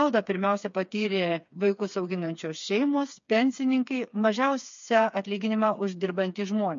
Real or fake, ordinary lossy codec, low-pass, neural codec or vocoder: fake; MP3, 48 kbps; 7.2 kHz; codec, 16 kHz, 4 kbps, FreqCodec, smaller model